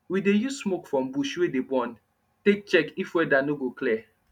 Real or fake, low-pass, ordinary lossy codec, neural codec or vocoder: real; 19.8 kHz; none; none